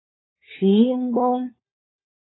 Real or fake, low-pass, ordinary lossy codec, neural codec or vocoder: fake; 7.2 kHz; AAC, 16 kbps; codec, 16 kHz, 4 kbps, FunCodec, trained on LibriTTS, 50 frames a second